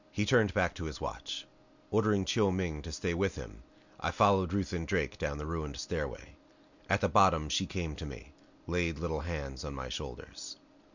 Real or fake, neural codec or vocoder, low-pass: real; none; 7.2 kHz